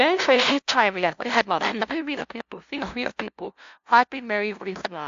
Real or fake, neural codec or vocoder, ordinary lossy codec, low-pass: fake; codec, 16 kHz, 0.5 kbps, FunCodec, trained on LibriTTS, 25 frames a second; AAC, 64 kbps; 7.2 kHz